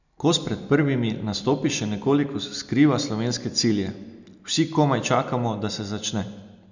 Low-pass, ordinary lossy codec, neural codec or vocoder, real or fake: 7.2 kHz; none; none; real